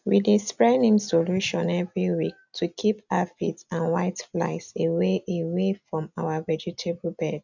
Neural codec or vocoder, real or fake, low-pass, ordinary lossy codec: none; real; 7.2 kHz; none